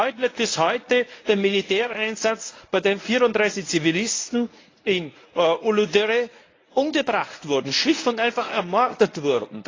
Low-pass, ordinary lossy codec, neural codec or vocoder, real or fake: 7.2 kHz; AAC, 32 kbps; codec, 24 kHz, 0.9 kbps, WavTokenizer, medium speech release version 1; fake